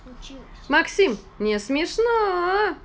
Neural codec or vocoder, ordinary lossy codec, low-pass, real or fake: none; none; none; real